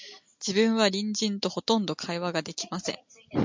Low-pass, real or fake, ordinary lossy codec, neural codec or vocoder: 7.2 kHz; real; MP3, 64 kbps; none